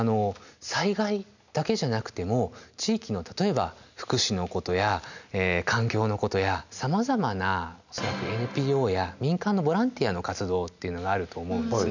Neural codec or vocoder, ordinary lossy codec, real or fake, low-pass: none; none; real; 7.2 kHz